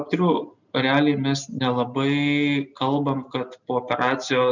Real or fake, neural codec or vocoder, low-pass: real; none; 7.2 kHz